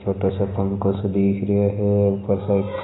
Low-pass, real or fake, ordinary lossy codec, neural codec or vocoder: 7.2 kHz; real; AAC, 16 kbps; none